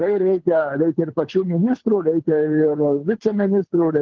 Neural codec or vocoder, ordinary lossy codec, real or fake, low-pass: codec, 24 kHz, 6 kbps, HILCodec; Opus, 16 kbps; fake; 7.2 kHz